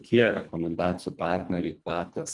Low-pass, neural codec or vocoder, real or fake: 10.8 kHz; codec, 24 kHz, 1.5 kbps, HILCodec; fake